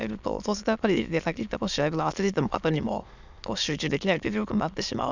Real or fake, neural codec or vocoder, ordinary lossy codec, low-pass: fake; autoencoder, 22.05 kHz, a latent of 192 numbers a frame, VITS, trained on many speakers; none; 7.2 kHz